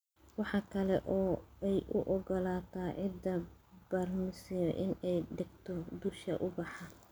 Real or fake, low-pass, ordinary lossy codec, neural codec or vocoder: real; none; none; none